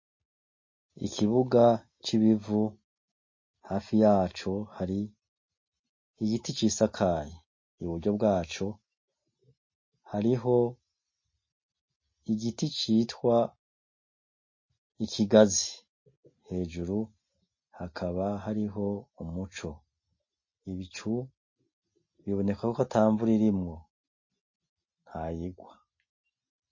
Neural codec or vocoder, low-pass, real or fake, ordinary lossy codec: none; 7.2 kHz; real; MP3, 32 kbps